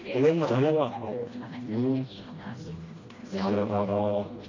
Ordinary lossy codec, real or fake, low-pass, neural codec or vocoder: AAC, 32 kbps; fake; 7.2 kHz; codec, 16 kHz, 1 kbps, FreqCodec, smaller model